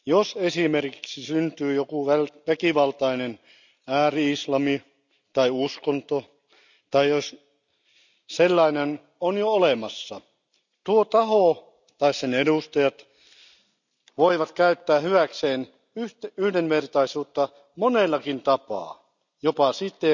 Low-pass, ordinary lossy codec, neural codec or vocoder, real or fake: 7.2 kHz; none; none; real